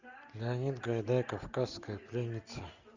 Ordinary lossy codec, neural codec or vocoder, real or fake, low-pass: Opus, 64 kbps; none; real; 7.2 kHz